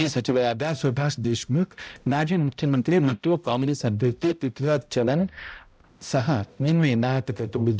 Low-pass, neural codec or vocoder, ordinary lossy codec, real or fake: none; codec, 16 kHz, 0.5 kbps, X-Codec, HuBERT features, trained on balanced general audio; none; fake